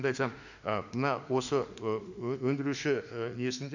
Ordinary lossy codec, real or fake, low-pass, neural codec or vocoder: none; fake; 7.2 kHz; autoencoder, 48 kHz, 32 numbers a frame, DAC-VAE, trained on Japanese speech